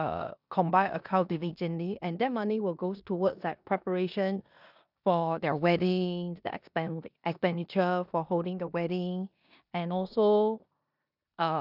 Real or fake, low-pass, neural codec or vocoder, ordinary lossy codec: fake; 5.4 kHz; codec, 16 kHz in and 24 kHz out, 0.9 kbps, LongCat-Audio-Codec, four codebook decoder; none